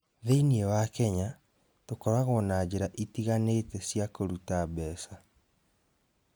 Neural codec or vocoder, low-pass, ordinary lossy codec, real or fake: none; none; none; real